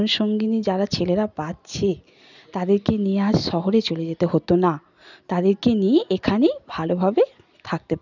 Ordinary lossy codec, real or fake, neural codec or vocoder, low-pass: none; real; none; 7.2 kHz